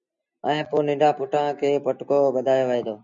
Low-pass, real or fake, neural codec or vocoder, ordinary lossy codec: 7.2 kHz; real; none; MP3, 64 kbps